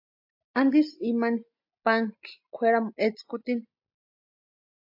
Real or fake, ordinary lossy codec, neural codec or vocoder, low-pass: real; AAC, 48 kbps; none; 5.4 kHz